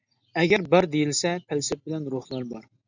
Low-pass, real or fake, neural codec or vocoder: 7.2 kHz; real; none